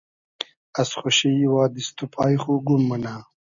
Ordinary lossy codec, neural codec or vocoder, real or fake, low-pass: MP3, 96 kbps; none; real; 7.2 kHz